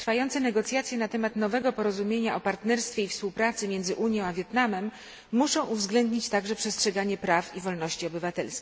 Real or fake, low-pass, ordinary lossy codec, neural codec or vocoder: real; none; none; none